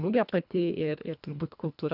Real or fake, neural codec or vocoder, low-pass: fake; codec, 24 kHz, 1.5 kbps, HILCodec; 5.4 kHz